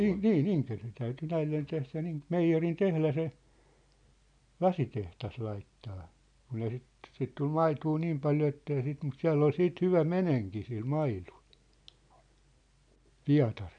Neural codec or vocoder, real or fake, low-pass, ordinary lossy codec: none; real; 9.9 kHz; none